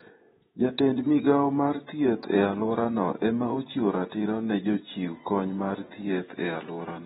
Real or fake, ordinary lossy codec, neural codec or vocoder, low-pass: fake; AAC, 16 kbps; vocoder, 48 kHz, 128 mel bands, Vocos; 19.8 kHz